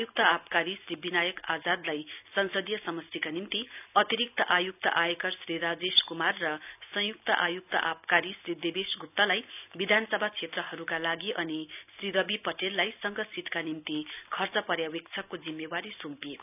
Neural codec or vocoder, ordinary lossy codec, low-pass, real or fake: none; none; 3.6 kHz; real